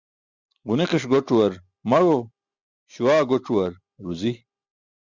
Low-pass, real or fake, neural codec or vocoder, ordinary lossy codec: 7.2 kHz; real; none; Opus, 64 kbps